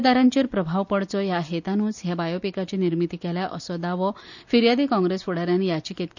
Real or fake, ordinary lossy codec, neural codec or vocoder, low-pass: real; none; none; 7.2 kHz